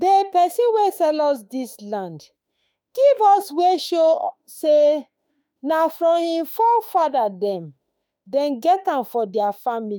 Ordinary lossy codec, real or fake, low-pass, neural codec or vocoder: none; fake; none; autoencoder, 48 kHz, 32 numbers a frame, DAC-VAE, trained on Japanese speech